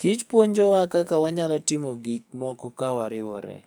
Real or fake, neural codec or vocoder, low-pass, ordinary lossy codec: fake; codec, 44.1 kHz, 2.6 kbps, SNAC; none; none